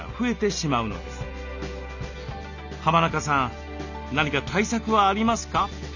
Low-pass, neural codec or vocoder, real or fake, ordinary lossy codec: 7.2 kHz; none; real; none